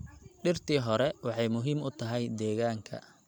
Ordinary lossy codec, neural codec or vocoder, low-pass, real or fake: none; none; 19.8 kHz; real